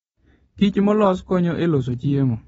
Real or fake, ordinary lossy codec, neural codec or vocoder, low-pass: real; AAC, 24 kbps; none; 19.8 kHz